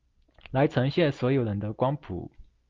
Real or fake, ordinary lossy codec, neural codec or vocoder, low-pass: real; Opus, 32 kbps; none; 7.2 kHz